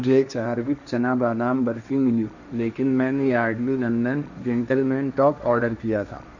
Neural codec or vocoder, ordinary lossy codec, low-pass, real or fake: codec, 16 kHz, 1.1 kbps, Voila-Tokenizer; none; 7.2 kHz; fake